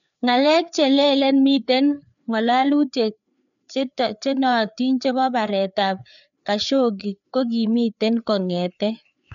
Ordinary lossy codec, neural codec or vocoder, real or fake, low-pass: none; codec, 16 kHz, 4 kbps, FreqCodec, larger model; fake; 7.2 kHz